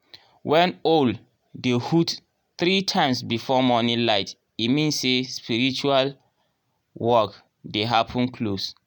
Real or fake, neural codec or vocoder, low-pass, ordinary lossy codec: real; none; 19.8 kHz; none